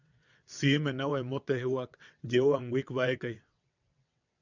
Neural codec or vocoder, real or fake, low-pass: vocoder, 22.05 kHz, 80 mel bands, WaveNeXt; fake; 7.2 kHz